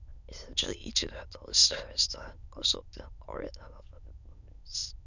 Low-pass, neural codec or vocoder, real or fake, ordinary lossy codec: 7.2 kHz; autoencoder, 22.05 kHz, a latent of 192 numbers a frame, VITS, trained on many speakers; fake; none